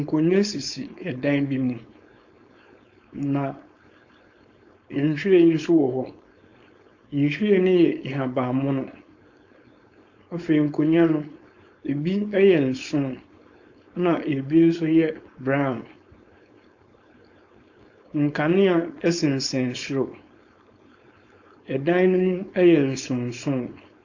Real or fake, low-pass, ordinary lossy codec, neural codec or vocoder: fake; 7.2 kHz; AAC, 48 kbps; codec, 16 kHz, 4.8 kbps, FACodec